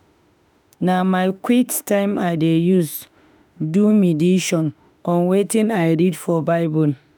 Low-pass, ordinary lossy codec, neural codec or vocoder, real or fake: none; none; autoencoder, 48 kHz, 32 numbers a frame, DAC-VAE, trained on Japanese speech; fake